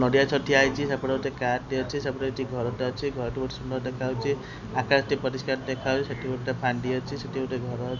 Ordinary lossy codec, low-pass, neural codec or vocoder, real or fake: none; 7.2 kHz; none; real